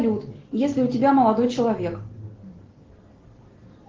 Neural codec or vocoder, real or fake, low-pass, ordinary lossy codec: none; real; 7.2 kHz; Opus, 16 kbps